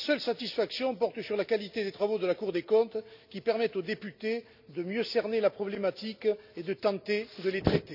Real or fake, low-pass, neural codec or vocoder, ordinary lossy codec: real; 5.4 kHz; none; none